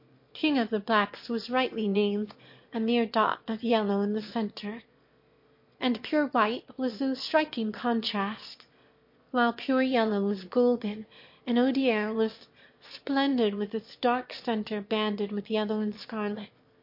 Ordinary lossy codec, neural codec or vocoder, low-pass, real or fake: MP3, 32 kbps; autoencoder, 22.05 kHz, a latent of 192 numbers a frame, VITS, trained on one speaker; 5.4 kHz; fake